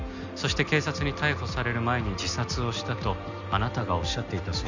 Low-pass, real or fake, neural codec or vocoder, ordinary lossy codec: 7.2 kHz; real; none; none